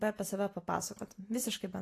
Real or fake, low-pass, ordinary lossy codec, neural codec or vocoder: real; 14.4 kHz; AAC, 48 kbps; none